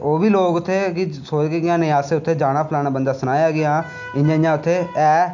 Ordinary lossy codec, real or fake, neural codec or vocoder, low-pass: none; real; none; 7.2 kHz